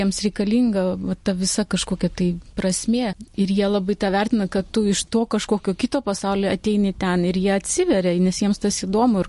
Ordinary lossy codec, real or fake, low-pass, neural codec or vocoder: MP3, 48 kbps; real; 14.4 kHz; none